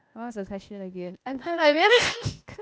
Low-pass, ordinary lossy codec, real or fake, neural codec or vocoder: none; none; fake; codec, 16 kHz, 0.8 kbps, ZipCodec